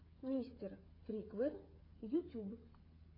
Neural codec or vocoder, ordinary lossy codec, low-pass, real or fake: codec, 16 kHz, 8 kbps, FreqCodec, smaller model; AAC, 24 kbps; 5.4 kHz; fake